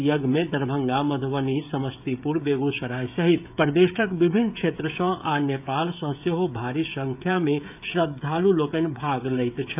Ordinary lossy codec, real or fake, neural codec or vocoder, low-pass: AAC, 32 kbps; fake; codec, 16 kHz, 16 kbps, FreqCodec, smaller model; 3.6 kHz